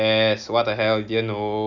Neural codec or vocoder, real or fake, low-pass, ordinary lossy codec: none; real; 7.2 kHz; none